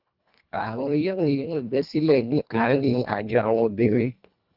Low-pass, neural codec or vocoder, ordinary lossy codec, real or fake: 5.4 kHz; codec, 24 kHz, 1.5 kbps, HILCodec; Opus, 24 kbps; fake